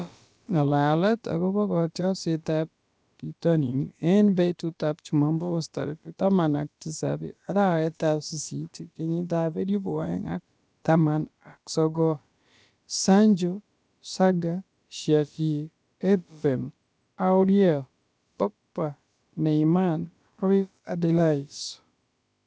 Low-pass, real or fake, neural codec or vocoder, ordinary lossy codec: none; fake; codec, 16 kHz, about 1 kbps, DyCAST, with the encoder's durations; none